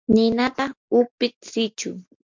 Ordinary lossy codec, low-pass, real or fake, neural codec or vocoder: MP3, 64 kbps; 7.2 kHz; real; none